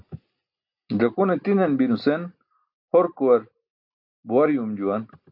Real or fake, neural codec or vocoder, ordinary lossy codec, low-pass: real; none; AAC, 48 kbps; 5.4 kHz